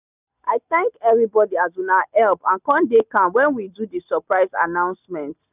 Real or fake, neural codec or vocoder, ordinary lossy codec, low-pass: real; none; none; 3.6 kHz